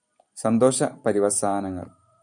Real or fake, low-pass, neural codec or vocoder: fake; 10.8 kHz; vocoder, 44.1 kHz, 128 mel bands every 512 samples, BigVGAN v2